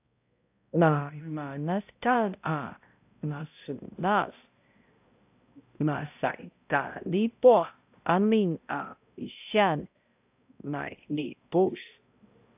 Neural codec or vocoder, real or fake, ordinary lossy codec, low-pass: codec, 16 kHz, 0.5 kbps, X-Codec, HuBERT features, trained on balanced general audio; fake; AAC, 32 kbps; 3.6 kHz